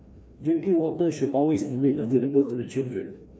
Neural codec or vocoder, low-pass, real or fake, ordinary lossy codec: codec, 16 kHz, 1 kbps, FreqCodec, larger model; none; fake; none